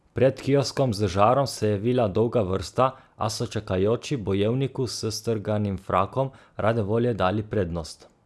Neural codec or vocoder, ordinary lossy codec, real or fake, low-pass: none; none; real; none